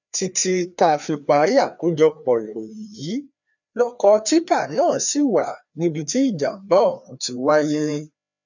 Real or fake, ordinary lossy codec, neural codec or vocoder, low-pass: fake; none; codec, 16 kHz, 2 kbps, FreqCodec, larger model; 7.2 kHz